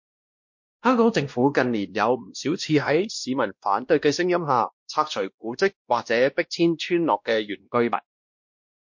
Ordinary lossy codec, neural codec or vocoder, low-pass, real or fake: MP3, 48 kbps; codec, 16 kHz, 1 kbps, X-Codec, WavLM features, trained on Multilingual LibriSpeech; 7.2 kHz; fake